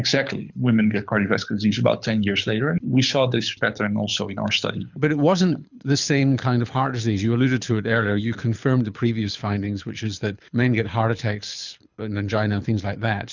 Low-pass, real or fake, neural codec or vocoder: 7.2 kHz; fake; codec, 24 kHz, 6 kbps, HILCodec